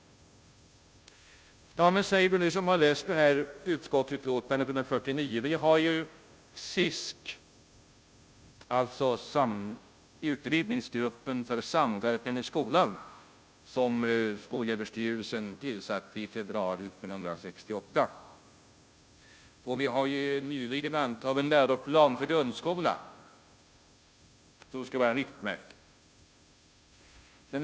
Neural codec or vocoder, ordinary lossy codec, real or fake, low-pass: codec, 16 kHz, 0.5 kbps, FunCodec, trained on Chinese and English, 25 frames a second; none; fake; none